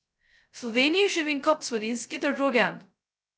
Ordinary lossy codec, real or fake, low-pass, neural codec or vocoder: none; fake; none; codec, 16 kHz, 0.2 kbps, FocalCodec